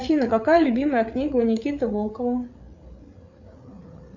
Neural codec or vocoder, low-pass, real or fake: codec, 16 kHz, 8 kbps, FreqCodec, larger model; 7.2 kHz; fake